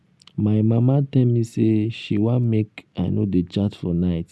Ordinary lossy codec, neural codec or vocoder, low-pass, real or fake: none; none; none; real